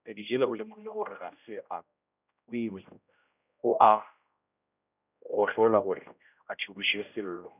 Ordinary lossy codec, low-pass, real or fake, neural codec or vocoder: none; 3.6 kHz; fake; codec, 16 kHz, 0.5 kbps, X-Codec, HuBERT features, trained on general audio